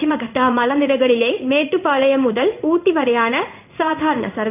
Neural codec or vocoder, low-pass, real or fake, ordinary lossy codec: codec, 16 kHz in and 24 kHz out, 1 kbps, XY-Tokenizer; 3.6 kHz; fake; none